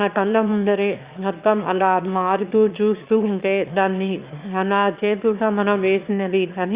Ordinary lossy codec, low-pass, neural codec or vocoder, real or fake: Opus, 64 kbps; 3.6 kHz; autoencoder, 22.05 kHz, a latent of 192 numbers a frame, VITS, trained on one speaker; fake